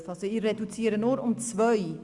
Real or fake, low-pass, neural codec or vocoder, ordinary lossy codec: real; 10.8 kHz; none; Opus, 64 kbps